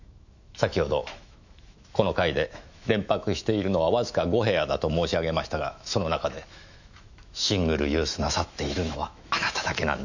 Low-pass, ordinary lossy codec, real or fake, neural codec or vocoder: 7.2 kHz; none; fake; autoencoder, 48 kHz, 128 numbers a frame, DAC-VAE, trained on Japanese speech